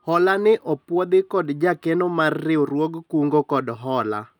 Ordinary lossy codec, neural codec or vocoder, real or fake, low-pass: none; none; real; 19.8 kHz